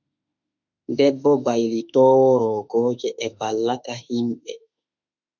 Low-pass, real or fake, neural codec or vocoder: 7.2 kHz; fake; autoencoder, 48 kHz, 32 numbers a frame, DAC-VAE, trained on Japanese speech